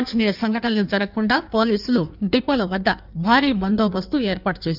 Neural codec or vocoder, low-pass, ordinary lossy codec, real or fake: codec, 16 kHz in and 24 kHz out, 1.1 kbps, FireRedTTS-2 codec; 5.4 kHz; none; fake